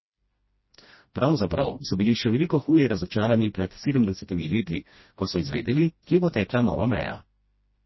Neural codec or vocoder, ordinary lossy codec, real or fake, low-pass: codec, 16 kHz, 1 kbps, FreqCodec, smaller model; MP3, 24 kbps; fake; 7.2 kHz